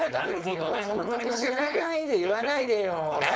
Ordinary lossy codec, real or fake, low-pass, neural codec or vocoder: none; fake; none; codec, 16 kHz, 4.8 kbps, FACodec